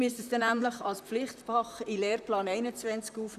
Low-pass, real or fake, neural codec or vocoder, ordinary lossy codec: 14.4 kHz; fake; vocoder, 44.1 kHz, 128 mel bands, Pupu-Vocoder; none